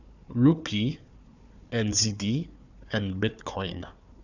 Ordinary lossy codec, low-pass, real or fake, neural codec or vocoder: none; 7.2 kHz; fake; codec, 16 kHz, 4 kbps, FunCodec, trained on Chinese and English, 50 frames a second